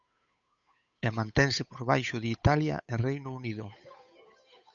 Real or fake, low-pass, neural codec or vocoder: fake; 7.2 kHz; codec, 16 kHz, 8 kbps, FunCodec, trained on Chinese and English, 25 frames a second